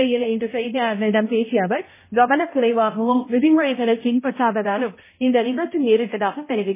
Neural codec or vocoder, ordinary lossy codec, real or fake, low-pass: codec, 16 kHz, 0.5 kbps, X-Codec, HuBERT features, trained on balanced general audio; MP3, 16 kbps; fake; 3.6 kHz